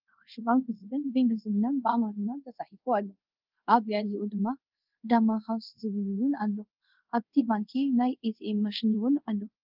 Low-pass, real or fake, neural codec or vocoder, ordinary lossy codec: 5.4 kHz; fake; codec, 24 kHz, 0.5 kbps, DualCodec; Opus, 24 kbps